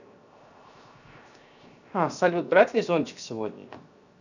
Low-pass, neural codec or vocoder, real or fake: 7.2 kHz; codec, 16 kHz, 0.7 kbps, FocalCodec; fake